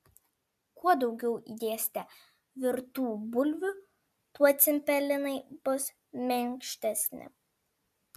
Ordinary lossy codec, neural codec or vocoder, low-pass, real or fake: MP3, 96 kbps; none; 14.4 kHz; real